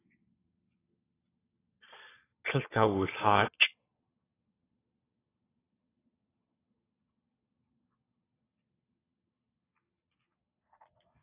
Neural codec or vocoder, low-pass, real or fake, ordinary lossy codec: vocoder, 22.05 kHz, 80 mel bands, Vocos; 3.6 kHz; fake; AAC, 16 kbps